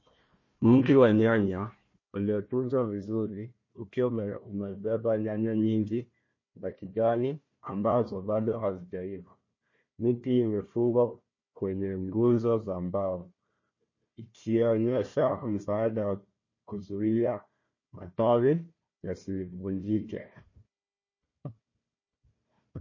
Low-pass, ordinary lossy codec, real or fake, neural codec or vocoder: 7.2 kHz; MP3, 32 kbps; fake; codec, 16 kHz, 1 kbps, FunCodec, trained on Chinese and English, 50 frames a second